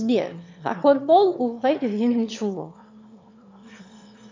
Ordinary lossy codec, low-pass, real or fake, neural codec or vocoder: MP3, 64 kbps; 7.2 kHz; fake; autoencoder, 22.05 kHz, a latent of 192 numbers a frame, VITS, trained on one speaker